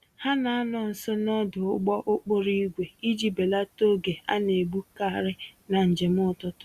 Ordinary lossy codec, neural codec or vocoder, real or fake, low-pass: Opus, 64 kbps; none; real; 14.4 kHz